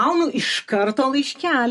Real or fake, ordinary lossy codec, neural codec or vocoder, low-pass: real; MP3, 48 kbps; none; 14.4 kHz